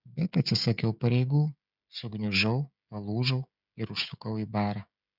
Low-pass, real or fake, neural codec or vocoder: 5.4 kHz; fake; codec, 16 kHz, 16 kbps, FreqCodec, smaller model